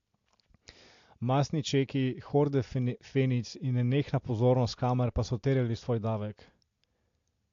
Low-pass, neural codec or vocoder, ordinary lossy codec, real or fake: 7.2 kHz; none; AAC, 48 kbps; real